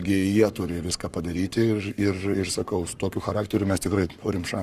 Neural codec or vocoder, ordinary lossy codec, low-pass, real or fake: codec, 44.1 kHz, 7.8 kbps, Pupu-Codec; Opus, 64 kbps; 14.4 kHz; fake